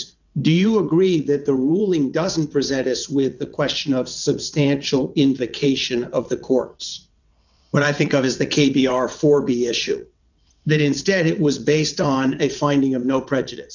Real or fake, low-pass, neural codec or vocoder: fake; 7.2 kHz; vocoder, 22.05 kHz, 80 mel bands, WaveNeXt